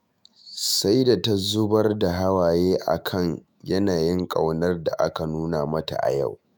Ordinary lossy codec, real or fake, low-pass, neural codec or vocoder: none; fake; none; autoencoder, 48 kHz, 128 numbers a frame, DAC-VAE, trained on Japanese speech